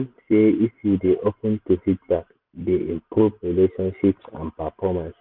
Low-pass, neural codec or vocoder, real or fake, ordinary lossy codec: 5.4 kHz; none; real; none